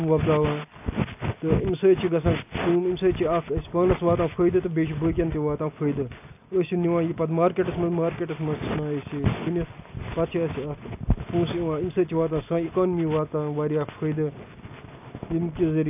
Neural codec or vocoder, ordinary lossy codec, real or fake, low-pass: none; AAC, 32 kbps; real; 3.6 kHz